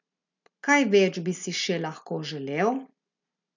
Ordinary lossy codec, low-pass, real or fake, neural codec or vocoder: none; 7.2 kHz; real; none